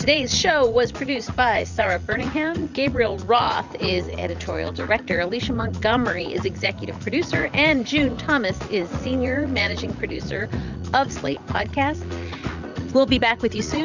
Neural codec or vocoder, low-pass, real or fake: vocoder, 44.1 kHz, 80 mel bands, Vocos; 7.2 kHz; fake